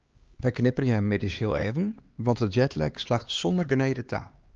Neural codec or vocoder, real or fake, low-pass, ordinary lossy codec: codec, 16 kHz, 2 kbps, X-Codec, HuBERT features, trained on LibriSpeech; fake; 7.2 kHz; Opus, 24 kbps